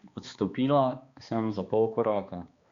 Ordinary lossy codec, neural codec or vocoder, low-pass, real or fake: Opus, 64 kbps; codec, 16 kHz, 2 kbps, X-Codec, HuBERT features, trained on balanced general audio; 7.2 kHz; fake